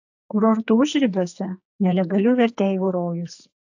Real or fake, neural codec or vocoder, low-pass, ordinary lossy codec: fake; codec, 44.1 kHz, 2.6 kbps, SNAC; 7.2 kHz; AAC, 48 kbps